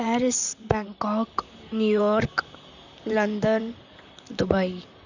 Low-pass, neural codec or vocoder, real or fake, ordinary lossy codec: 7.2 kHz; vocoder, 44.1 kHz, 128 mel bands, Pupu-Vocoder; fake; none